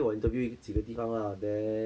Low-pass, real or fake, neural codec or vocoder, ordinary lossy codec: none; real; none; none